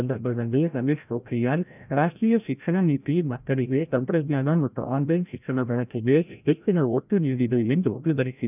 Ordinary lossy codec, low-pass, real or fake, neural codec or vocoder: none; 3.6 kHz; fake; codec, 16 kHz, 0.5 kbps, FreqCodec, larger model